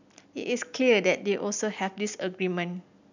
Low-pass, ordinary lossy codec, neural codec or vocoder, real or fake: 7.2 kHz; none; none; real